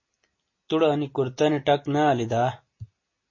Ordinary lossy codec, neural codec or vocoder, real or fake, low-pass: MP3, 32 kbps; none; real; 7.2 kHz